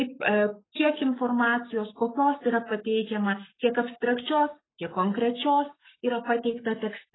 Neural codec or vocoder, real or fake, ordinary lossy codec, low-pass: codec, 44.1 kHz, 7.8 kbps, Pupu-Codec; fake; AAC, 16 kbps; 7.2 kHz